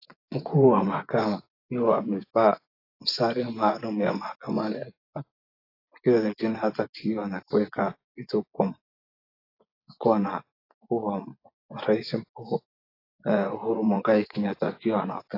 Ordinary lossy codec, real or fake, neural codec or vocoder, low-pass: AAC, 32 kbps; fake; vocoder, 24 kHz, 100 mel bands, Vocos; 5.4 kHz